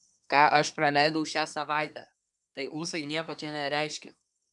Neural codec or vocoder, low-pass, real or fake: codec, 24 kHz, 1 kbps, SNAC; 10.8 kHz; fake